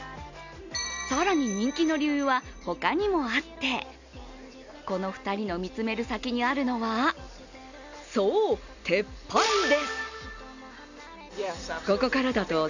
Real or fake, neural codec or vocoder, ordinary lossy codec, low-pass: real; none; none; 7.2 kHz